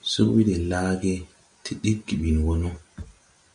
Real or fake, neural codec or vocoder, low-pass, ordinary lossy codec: real; none; 9.9 kHz; MP3, 96 kbps